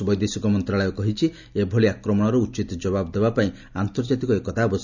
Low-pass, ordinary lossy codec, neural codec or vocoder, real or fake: 7.2 kHz; none; none; real